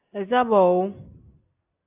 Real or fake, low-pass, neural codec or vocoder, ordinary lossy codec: real; 3.6 kHz; none; AAC, 24 kbps